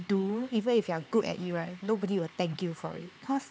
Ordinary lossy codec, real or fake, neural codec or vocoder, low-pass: none; fake; codec, 16 kHz, 4 kbps, X-Codec, HuBERT features, trained on LibriSpeech; none